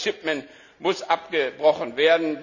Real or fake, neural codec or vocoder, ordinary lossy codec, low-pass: real; none; none; 7.2 kHz